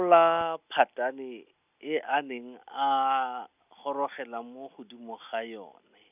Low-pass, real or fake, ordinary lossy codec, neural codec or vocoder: 3.6 kHz; real; none; none